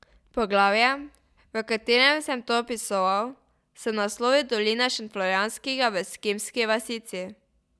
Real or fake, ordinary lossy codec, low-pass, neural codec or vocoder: real; none; none; none